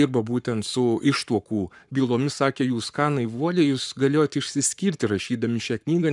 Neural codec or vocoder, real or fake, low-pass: codec, 44.1 kHz, 7.8 kbps, Pupu-Codec; fake; 10.8 kHz